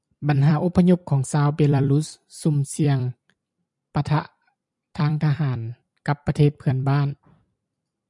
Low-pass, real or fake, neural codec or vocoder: 10.8 kHz; fake; vocoder, 44.1 kHz, 128 mel bands every 256 samples, BigVGAN v2